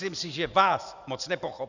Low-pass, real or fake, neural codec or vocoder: 7.2 kHz; fake; vocoder, 44.1 kHz, 128 mel bands every 512 samples, BigVGAN v2